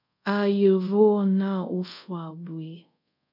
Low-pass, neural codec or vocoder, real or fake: 5.4 kHz; codec, 24 kHz, 0.5 kbps, DualCodec; fake